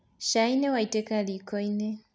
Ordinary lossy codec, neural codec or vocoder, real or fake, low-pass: none; none; real; none